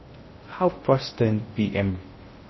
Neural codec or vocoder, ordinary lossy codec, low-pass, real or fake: codec, 16 kHz in and 24 kHz out, 0.6 kbps, FocalCodec, streaming, 2048 codes; MP3, 24 kbps; 7.2 kHz; fake